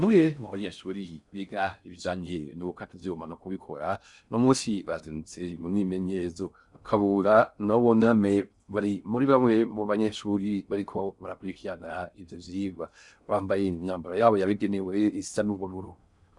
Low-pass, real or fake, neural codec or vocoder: 10.8 kHz; fake; codec, 16 kHz in and 24 kHz out, 0.6 kbps, FocalCodec, streaming, 4096 codes